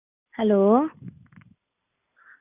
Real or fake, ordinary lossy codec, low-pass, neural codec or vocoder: real; none; 3.6 kHz; none